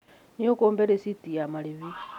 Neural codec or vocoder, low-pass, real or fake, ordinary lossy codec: none; 19.8 kHz; real; none